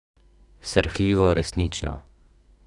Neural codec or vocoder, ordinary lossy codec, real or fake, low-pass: codec, 32 kHz, 1.9 kbps, SNAC; none; fake; 10.8 kHz